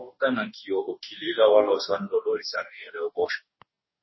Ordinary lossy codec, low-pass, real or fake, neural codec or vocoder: MP3, 24 kbps; 7.2 kHz; fake; codec, 44.1 kHz, 2.6 kbps, DAC